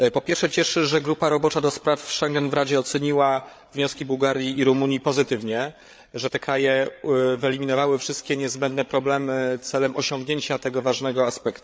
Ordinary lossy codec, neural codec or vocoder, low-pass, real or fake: none; codec, 16 kHz, 8 kbps, FreqCodec, larger model; none; fake